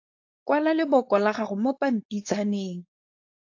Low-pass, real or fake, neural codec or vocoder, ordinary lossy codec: 7.2 kHz; fake; codec, 16 kHz, 4.8 kbps, FACodec; AAC, 48 kbps